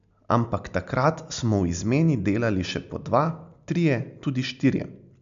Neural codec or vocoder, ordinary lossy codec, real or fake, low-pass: none; MP3, 64 kbps; real; 7.2 kHz